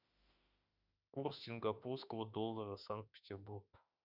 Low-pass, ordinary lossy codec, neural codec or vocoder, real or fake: 5.4 kHz; Opus, 64 kbps; autoencoder, 48 kHz, 32 numbers a frame, DAC-VAE, trained on Japanese speech; fake